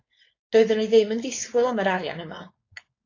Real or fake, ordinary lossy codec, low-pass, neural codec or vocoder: fake; AAC, 32 kbps; 7.2 kHz; codec, 16 kHz, 4.8 kbps, FACodec